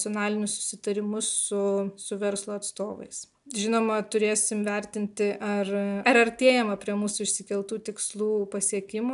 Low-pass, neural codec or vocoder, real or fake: 10.8 kHz; none; real